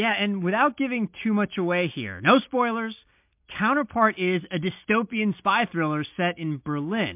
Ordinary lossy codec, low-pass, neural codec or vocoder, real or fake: MP3, 32 kbps; 3.6 kHz; none; real